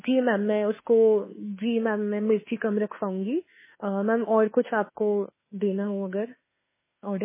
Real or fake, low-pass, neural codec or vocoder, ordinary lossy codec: fake; 3.6 kHz; autoencoder, 48 kHz, 32 numbers a frame, DAC-VAE, trained on Japanese speech; MP3, 16 kbps